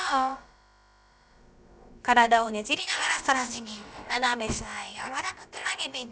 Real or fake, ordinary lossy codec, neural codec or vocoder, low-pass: fake; none; codec, 16 kHz, about 1 kbps, DyCAST, with the encoder's durations; none